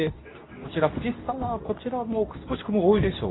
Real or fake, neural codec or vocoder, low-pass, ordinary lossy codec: fake; codec, 24 kHz, 0.9 kbps, WavTokenizer, medium speech release version 2; 7.2 kHz; AAC, 16 kbps